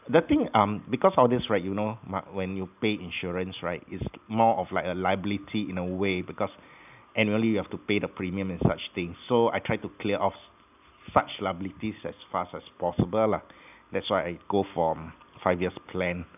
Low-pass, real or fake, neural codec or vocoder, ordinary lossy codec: 3.6 kHz; real; none; none